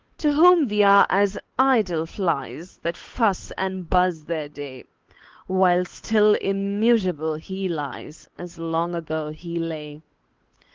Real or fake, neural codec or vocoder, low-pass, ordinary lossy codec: fake; codec, 16 kHz, 8 kbps, FunCodec, trained on Chinese and English, 25 frames a second; 7.2 kHz; Opus, 16 kbps